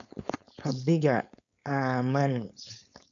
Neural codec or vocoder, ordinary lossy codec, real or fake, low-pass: codec, 16 kHz, 4.8 kbps, FACodec; none; fake; 7.2 kHz